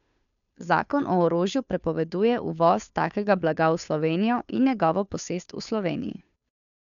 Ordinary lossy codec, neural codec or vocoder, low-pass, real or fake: none; codec, 16 kHz, 2 kbps, FunCodec, trained on Chinese and English, 25 frames a second; 7.2 kHz; fake